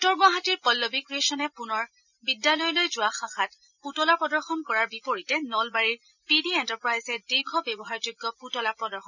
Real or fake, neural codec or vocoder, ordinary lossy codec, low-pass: real; none; none; 7.2 kHz